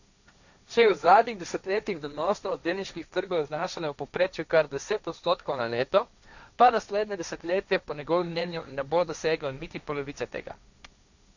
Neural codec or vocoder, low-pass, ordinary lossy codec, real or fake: codec, 16 kHz, 1.1 kbps, Voila-Tokenizer; 7.2 kHz; none; fake